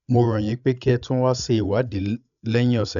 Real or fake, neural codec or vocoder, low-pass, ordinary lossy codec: fake; codec, 16 kHz, 16 kbps, FreqCodec, larger model; 7.2 kHz; none